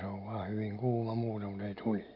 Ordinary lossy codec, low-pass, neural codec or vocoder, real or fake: AAC, 48 kbps; 5.4 kHz; none; real